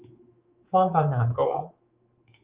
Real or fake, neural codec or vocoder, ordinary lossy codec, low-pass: fake; codec, 24 kHz, 3.1 kbps, DualCodec; Opus, 32 kbps; 3.6 kHz